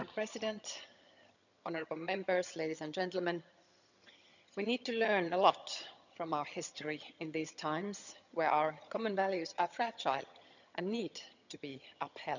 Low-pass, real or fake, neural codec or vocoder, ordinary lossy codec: 7.2 kHz; fake; vocoder, 22.05 kHz, 80 mel bands, HiFi-GAN; none